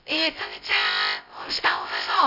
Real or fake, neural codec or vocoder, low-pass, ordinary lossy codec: fake; codec, 16 kHz, 0.2 kbps, FocalCodec; 5.4 kHz; none